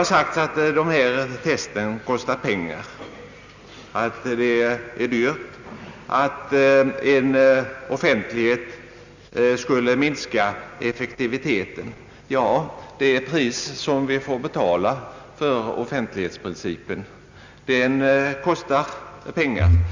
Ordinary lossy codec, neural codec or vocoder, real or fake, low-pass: Opus, 64 kbps; none; real; 7.2 kHz